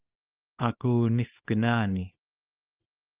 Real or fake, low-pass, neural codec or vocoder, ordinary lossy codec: fake; 3.6 kHz; codec, 24 kHz, 0.9 kbps, WavTokenizer, small release; Opus, 32 kbps